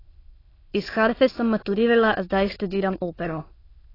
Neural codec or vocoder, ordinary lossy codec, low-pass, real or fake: autoencoder, 22.05 kHz, a latent of 192 numbers a frame, VITS, trained on many speakers; AAC, 24 kbps; 5.4 kHz; fake